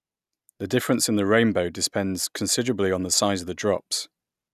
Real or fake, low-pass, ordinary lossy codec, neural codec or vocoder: real; 14.4 kHz; none; none